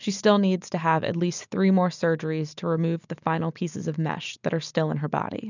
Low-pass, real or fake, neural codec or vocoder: 7.2 kHz; real; none